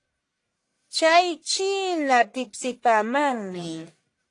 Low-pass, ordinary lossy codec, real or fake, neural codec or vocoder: 10.8 kHz; AAC, 48 kbps; fake; codec, 44.1 kHz, 1.7 kbps, Pupu-Codec